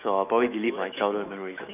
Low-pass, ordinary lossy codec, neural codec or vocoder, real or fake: 3.6 kHz; none; autoencoder, 48 kHz, 128 numbers a frame, DAC-VAE, trained on Japanese speech; fake